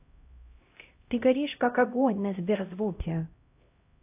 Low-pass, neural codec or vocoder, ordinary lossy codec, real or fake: 3.6 kHz; codec, 16 kHz, 0.5 kbps, X-Codec, HuBERT features, trained on LibriSpeech; AAC, 32 kbps; fake